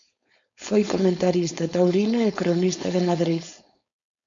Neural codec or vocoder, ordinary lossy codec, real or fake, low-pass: codec, 16 kHz, 4.8 kbps, FACodec; MP3, 64 kbps; fake; 7.2 kHz